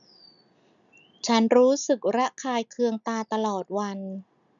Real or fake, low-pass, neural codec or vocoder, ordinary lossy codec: real; 7.2 kHz; none; none